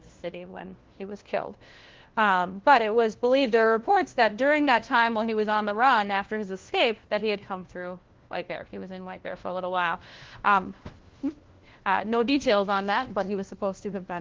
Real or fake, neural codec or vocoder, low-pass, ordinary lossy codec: fake; codec, 16 kHz, 1 kbps, FunCodec, trained on LibriTTS, 50 frames a second; 7.2 kHz; Opus, 16 kbps